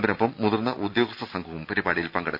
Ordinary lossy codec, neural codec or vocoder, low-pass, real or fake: none; none; 5.4 kHz; real